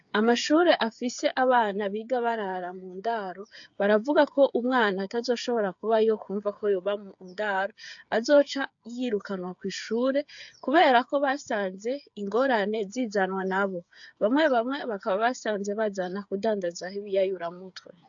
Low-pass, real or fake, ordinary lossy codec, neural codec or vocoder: 7.2 kHz; fake; AAC, 64 kbps; codec, 16 kHz, 8 kbps, FreqCodec, smaller model